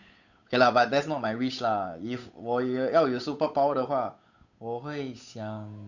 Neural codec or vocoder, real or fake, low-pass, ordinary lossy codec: codec, 16 kHz, 8 kbps, FunCodec, trained on Chinese and English, 25 frames a second; fake; 7.2 kHz; none